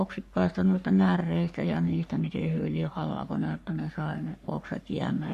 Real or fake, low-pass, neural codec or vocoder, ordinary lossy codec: fake; 14.4 kHz; codec, 44.1 kHz, 3.4 kbps, Pupu-Codec; AAC, 64 kbps